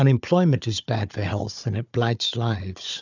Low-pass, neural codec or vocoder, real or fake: 7.2 kHz; codec, 16 kHz, 4 kbps, FunCodec, trained on Chinese and English, 50 frames a second; fake